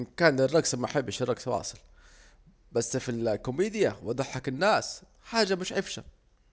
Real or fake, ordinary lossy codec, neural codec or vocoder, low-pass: real; none; none; none